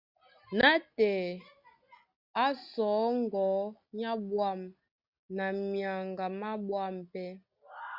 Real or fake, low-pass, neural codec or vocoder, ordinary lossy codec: real; 5.4 kHz; none; Opus, 64 kbps